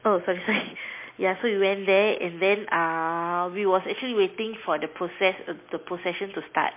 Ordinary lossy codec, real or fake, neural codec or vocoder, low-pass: MP3, 24 kbps; real; none; 3.6 kHz